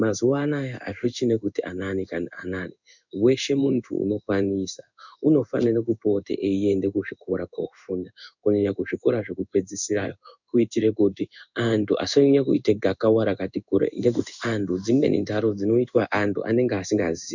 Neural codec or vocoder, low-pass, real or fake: codec, 16 kHz in and 24 kHz out, 1 kbps, XY-Tokenizer; 7.2 kHz; fake